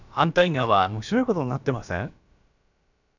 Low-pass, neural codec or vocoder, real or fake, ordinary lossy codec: 7.2 kHz; codec, 16 kHz, about 1 kbps, DyCAST, with the encoder's durations; fake; none